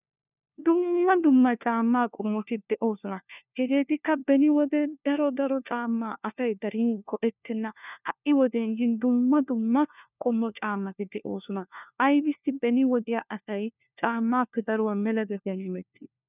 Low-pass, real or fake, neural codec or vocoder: 3.6 kHz; fake; codec, 16 kHz, 1 kbps, FunCodec, trained on LibriTTS, 50 frames a second